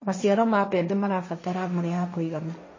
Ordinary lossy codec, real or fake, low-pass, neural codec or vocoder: MP3, 32 kbps; fake; 7.2 kHz; codec, 16 kHz, 1.1 kbps, Voila-Tokenizer